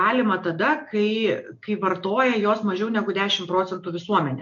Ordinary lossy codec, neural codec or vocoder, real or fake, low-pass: MP3, 48 kbps; none; real; 7.2 kHz